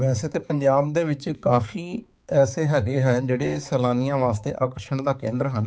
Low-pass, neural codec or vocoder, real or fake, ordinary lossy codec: none; codec, 16 kHz, 4 kbps, X-Codec, HuBERT features, trained on balanced general audio; fake; none